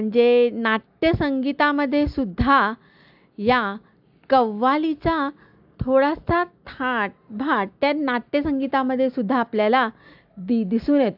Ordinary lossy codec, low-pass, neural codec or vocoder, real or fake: AAC, 48 kbps; 5.4 kHz; none; real